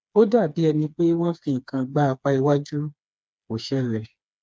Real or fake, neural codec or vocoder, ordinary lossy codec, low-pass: fake; codec, 16 kHz, 4 kbps, FreqCodec, smaller model; none; none